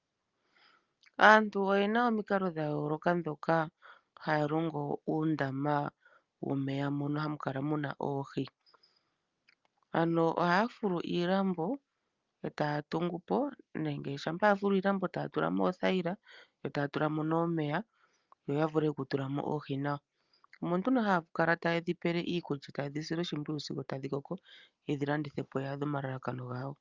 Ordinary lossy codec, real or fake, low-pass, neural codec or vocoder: Opus, 24 kbps; real; 7.2 kHz; none